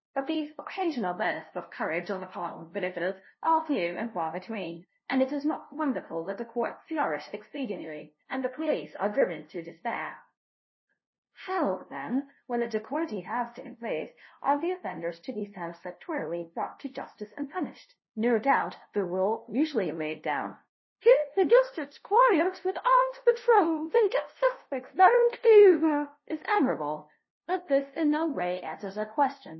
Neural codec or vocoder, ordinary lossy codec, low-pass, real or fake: codec, 16 kHz, 0.5 kbps, FunCodec, trained on LibriTTS, 25 frames a second; MP3, 24 kbps; 7.2 kHz; fake